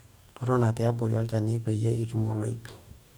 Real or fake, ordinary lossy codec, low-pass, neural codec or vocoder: fake; none; none; codec, 44.1 kHz, 2.6 kbps, DAC